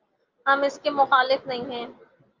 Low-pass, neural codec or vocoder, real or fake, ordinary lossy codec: 7.2 kHz; none; real; Opus, 24 kbps